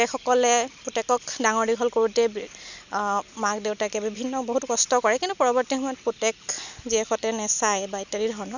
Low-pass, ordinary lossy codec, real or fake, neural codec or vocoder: 7.2 kHz; none; real; none